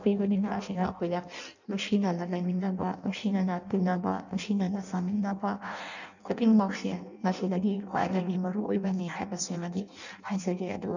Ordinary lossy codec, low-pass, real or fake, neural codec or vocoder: none; 7.2 kHz; fake; codec, 16 kHz in and 24 kHz out, 0.6 kbps, FireRedTTS-2 codec